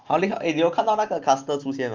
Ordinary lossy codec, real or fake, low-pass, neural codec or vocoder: Opus, 32 kbps; real; 7.2 kHz; none